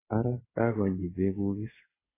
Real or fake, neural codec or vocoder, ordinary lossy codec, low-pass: fake; vocoder, 22.05 kHz, 80 mel bands, WaveNeXt; AAC, 24 kbps; 3.6 kHz